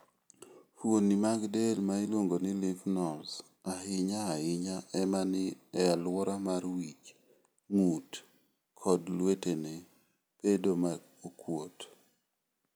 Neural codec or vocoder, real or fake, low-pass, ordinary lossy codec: none; real; none; none